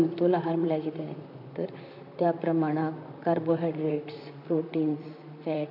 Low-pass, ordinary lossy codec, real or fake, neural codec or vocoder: 5.4 kHz; MP3, 48 kbps; fake; vocoder, 44.1 kHz, 128 mel bands, Pupu-Vocoder